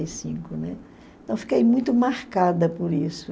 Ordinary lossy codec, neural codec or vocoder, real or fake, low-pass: none; none; real; none